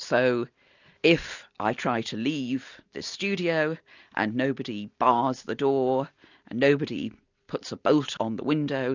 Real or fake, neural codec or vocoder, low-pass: real; none; 7.2 kHz